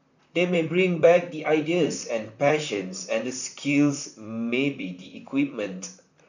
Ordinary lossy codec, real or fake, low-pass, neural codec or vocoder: none; fake; 7.2 kHz; vocoder, 44.1 kHz, 128 mel bands, Pupu-Vocoder